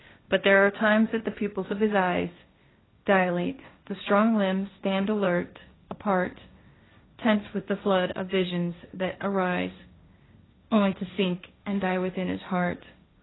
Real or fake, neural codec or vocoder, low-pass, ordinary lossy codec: fake; codec, 16 kHz, 1.1 kbps, Voila-Tokenizer; 7.2 kHz; AAC, 16 kbps